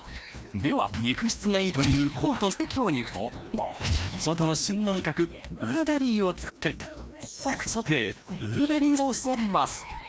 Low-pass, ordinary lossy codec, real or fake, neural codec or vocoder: none; none; fake; codec, 16 kHz, 1 kbps, FreqCodec, larger model